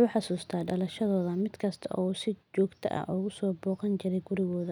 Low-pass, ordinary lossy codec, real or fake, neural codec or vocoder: 19.8 kHz; none; real; none